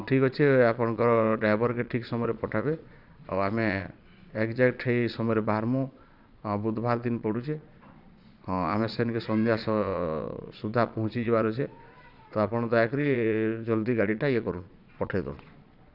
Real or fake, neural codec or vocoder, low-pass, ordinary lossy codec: fake; vocoder, 22.05 kHz, 80 mel bands, Vocos; 5.4 kHz; none